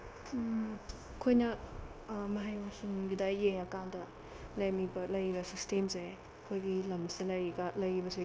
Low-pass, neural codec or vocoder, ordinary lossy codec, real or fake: none; codec, 16 kHz, 0.9 kbps, LongCat-Audio-Codec; none; fake